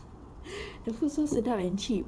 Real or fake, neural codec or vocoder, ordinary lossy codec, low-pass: fake; vocoder, 22.05 kHz, 80 mel bands, WaveNeXt; none; none